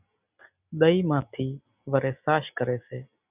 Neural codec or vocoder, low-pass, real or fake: none; 3.6 kHz; real